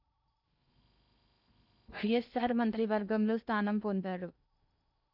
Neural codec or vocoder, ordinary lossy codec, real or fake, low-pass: codec, 16 kHz in and 24 kHz out, 0.8 kbps, FocalCodec, streaming, 65536 codes; none; fake; 5.4 kHz